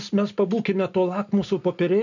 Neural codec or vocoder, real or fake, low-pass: none; real; 7.2 kHz